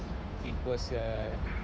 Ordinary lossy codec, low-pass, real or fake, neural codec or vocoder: none; none; fake; codec, 16 kHz, 8 kbps, FunCodec, trained on Chinese and English, 25 frames a second